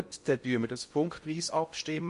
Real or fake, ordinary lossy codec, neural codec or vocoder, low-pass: fake; MP3, 48 kbps; codec, 16 kHz in and 24 kHz out, 0.8 kbps, FocalCodec, streaming, 65536 codes; 10.8 kHz